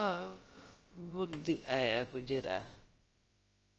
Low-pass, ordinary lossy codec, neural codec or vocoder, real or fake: 7.2 kHz; Opus, 24 kbps; codec, 16 kHz, about 1 kbps, DyCAST, with the encoder's durations; fake